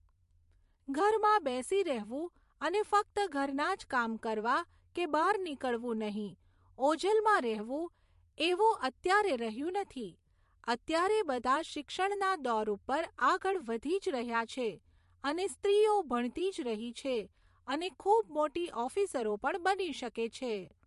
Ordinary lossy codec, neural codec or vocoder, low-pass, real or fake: MP3, 48 kbps; vocoder, 44.1 kHz, 128 mel bands every 512 samples, BigVGAN v2; 14.4 kHz; fake